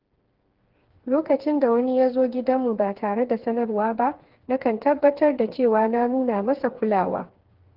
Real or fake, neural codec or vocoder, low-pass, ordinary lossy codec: fake; codec, 16 kHz, 4 kbps, FreqCodec, smaller model; 5.4 kHz; Opus, 16 kbps